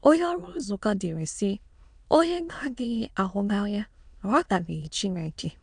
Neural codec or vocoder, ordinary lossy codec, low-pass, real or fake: autoencoder, 22.05 kHz, a latent of 192 numbers a frame, VITS, trained on many speakers; none; 9.9 kHz; fake